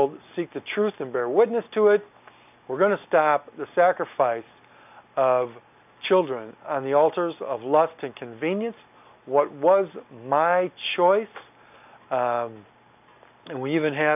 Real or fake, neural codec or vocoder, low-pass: real; none; 3.6 kHz